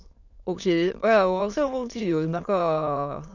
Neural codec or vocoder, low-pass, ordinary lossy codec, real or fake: autoencoder, 22.05 kHz, a latent of 192 numbers a frame, VITS, trained on many speakers; 7.2 kHz; none; fake